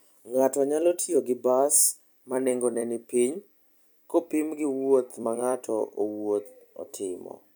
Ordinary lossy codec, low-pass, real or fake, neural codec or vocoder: none; none; fake; vocoder, 44.1 kHz, 128 mel bands every 256 samples, BigVGAN v2